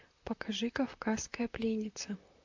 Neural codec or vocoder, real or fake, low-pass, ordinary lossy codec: vocoder, 44.1 kHz, 128 mel bands, Pupu-Vocoder; fake; 7.2 kHz; AAC, 48 kbps